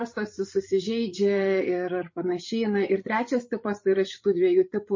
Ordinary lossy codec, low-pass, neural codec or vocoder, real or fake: MP3, 32 kbps; 7.2 kHz; codec, 16 kHz, 8 kbps, FreqCodec, larger model; fake